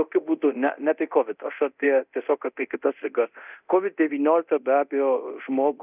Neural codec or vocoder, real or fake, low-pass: codec, 24 kHz, 0.9 kbps, DualCodec; fake; 3.6 kHz